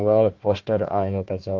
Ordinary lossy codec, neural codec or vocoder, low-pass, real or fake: Opus, 32 kbps; codec, 16 kHz, 1 kbps, FunCodec, trained on Chinese and English, 50 frames a second; 7.2 kHz; fake